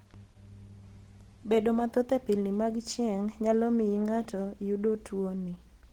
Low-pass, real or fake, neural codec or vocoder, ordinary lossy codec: 19.8 kHz; real; none; Opus, 16 kbps